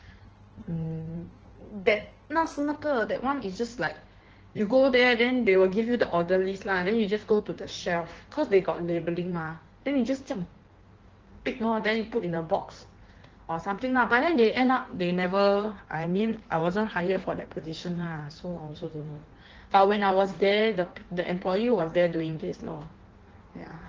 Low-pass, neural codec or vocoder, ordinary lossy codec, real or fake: 7.2 kHz; codec, 16 kHz in and 24 kHz out, 1.1 kbps, FireRedTTS-2 codec; Opus, 16 kbps; fake